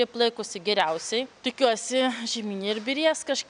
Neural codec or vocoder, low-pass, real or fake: none; 9.9 kHz; real